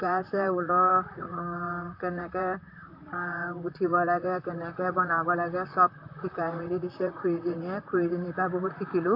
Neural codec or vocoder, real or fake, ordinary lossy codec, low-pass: vocoder, 44.1 kHz, 128 mel bands, Pupu-Vocoder; fake; AAC, 32 kbps; 5.4 kHz